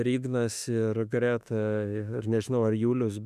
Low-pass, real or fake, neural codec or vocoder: 14.4 kHz; fake; autoencoder, 48 kHz, 32 numbers a frame, DAC-VAE, trained on Japanese speech